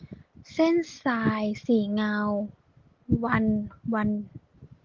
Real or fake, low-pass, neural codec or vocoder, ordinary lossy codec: real; 7.2 kHz; none; Opus, 16 kbps